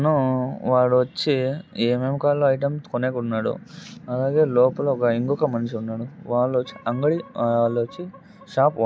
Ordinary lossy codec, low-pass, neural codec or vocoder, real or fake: none; none; none; real